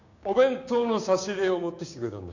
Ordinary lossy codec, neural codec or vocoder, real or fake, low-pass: none; codec, 16 kHz, 6 kbps, DAC; fake; 7.2 kHz